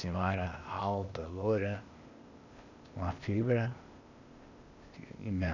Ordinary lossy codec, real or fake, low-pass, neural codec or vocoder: none; fake; 7.2 kHz; codec, 16 kHz, 0.8 kbps, ZipCodec